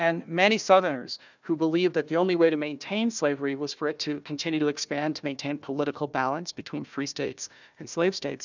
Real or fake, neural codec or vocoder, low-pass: fake; codec, 16 kHz, 1 kbps, FunCodec, trained on Chinese and English, 50 frames a second; 7.2 kHz